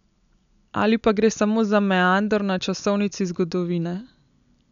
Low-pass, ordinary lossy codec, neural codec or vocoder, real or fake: 7.2 kHz; none; none; real